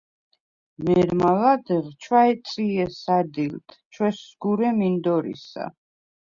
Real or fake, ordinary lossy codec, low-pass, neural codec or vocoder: real; Opus, 64 kbps; 7.2 kHz; none